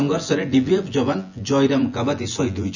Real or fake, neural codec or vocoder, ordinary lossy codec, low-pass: fake; vocoder, 24 kHz, 100 mel bands, Vocos; none; 7.2 kHz